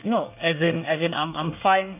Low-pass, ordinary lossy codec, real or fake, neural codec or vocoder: 3.6 kHz; none; fake; codec, 24 kHz, 1 kbps, SNAC